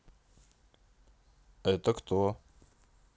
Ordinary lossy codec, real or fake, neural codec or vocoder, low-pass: none; real; none; none